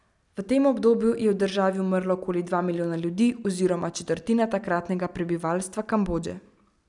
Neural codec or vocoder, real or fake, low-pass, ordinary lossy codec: none; real; 10.8 kHz; none